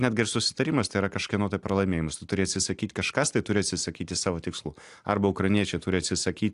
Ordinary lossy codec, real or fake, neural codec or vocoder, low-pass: AAC, 64 kbps; real; none; 10.8 kHz